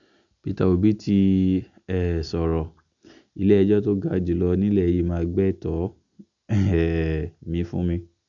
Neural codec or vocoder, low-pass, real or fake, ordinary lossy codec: none; 7.2 kHz; real; none